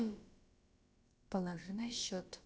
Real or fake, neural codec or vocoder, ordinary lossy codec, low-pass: fake; codec, 16 kHz, about 1 kbps, DyCAST, with the encoder's durations; none; none